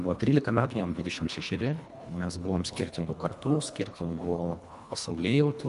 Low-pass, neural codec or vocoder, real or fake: 10.8 kHz; codec, 24 kHz, 1.5 kbps, HILCodec; fake